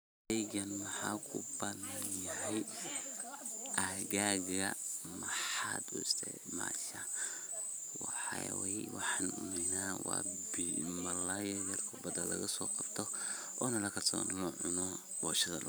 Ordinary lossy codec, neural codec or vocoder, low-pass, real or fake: none; vocoder, 44.1 kHz, 128 mel bands every 512 samples, BigVGAN v2; none; fake